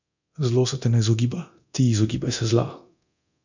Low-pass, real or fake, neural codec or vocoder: 7.2 kHz; fake; codec, 24 kHz, 0.9 kbps, DualCodec